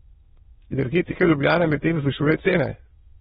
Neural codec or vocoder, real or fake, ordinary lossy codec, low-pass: autoencoder, 22.05 kHz, a latent of 192 numbers a frame, VITS, trained on many speakers; fake; AAC, 16 kbps; 9.9 kHz